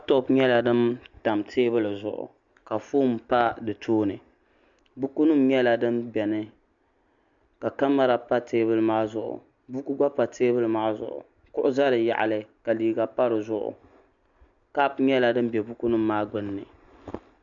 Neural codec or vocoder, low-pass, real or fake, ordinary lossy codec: none; 7.2 kHz; real; MP3, 64 kbps